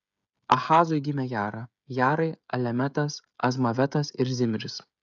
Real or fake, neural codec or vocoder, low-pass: fake; codec, 16 kHz, 16 kbps, FreqCodec, smaller model; 7.2 kHz